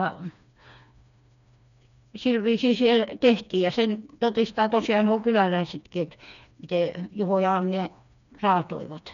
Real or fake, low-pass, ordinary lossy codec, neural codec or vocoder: fake; 7.2 kHz; none; codec, 16 kHz, 2 kbps, FreqCodec, smaller model